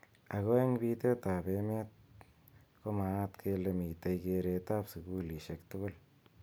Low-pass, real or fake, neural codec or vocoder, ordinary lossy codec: none; real; none; none